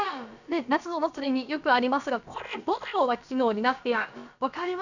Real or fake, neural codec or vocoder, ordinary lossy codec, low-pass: fake; codec, 16 kHz, about 1 kbps, DyCAST, with the encoder's durations; none; 7.2 kHz